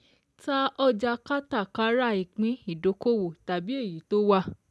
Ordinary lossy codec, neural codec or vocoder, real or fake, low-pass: none; none; real; none